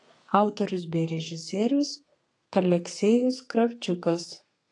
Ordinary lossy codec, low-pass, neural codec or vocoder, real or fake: AAC, 48 kbps; 10.8 kHz; codec, 32 kHz, 1.9 kbps, SNAC; fake